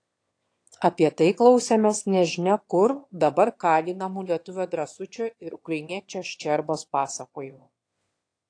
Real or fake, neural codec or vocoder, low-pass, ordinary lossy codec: fake; autoencoder, 22.05 kHz, a latent of 192 numbers a frame, VITS, trained on one speaker; 9.9 kHz; AAC, 48 kbps